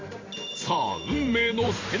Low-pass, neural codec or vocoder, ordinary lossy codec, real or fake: 7.2 kHz; vocoder, 44.1 kHz, 128 mel bands every 512 samples, BigVGAN v2; AAC, 32 kbps; fake